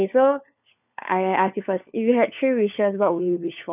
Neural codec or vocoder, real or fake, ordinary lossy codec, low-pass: codec, 16 kHz, 4 kbps, FunCodec, trained on LibriTTS, 50 frames a second; fake; none; 3.6 kHz